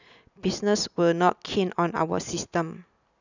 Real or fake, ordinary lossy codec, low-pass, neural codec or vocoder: real; none; 7.2 kHz; none